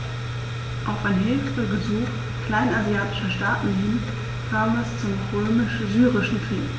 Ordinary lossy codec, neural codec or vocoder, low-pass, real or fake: none; none; none; real